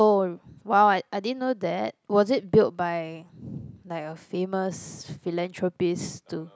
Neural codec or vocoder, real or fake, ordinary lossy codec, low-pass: none; real; none; none